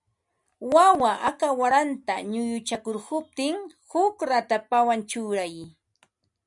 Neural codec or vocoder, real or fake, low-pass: none; real; 10.8 kHz